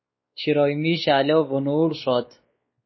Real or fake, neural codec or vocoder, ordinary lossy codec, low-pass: fake; codec, 16 kHz, 2 kbps, X-Codec, WavLM features, trained on Multilingual LibriSpeech; MP3, 24 kbps; 7.2 kHz